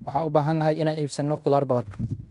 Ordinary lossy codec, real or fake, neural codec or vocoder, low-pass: MP3, 96 kbps; fake; codec, 16 kHz in and 24 kHz out, 0.9 kbps, LongCat-Audio-Codec, fine tuned four codebook decoder; 10.8 kHz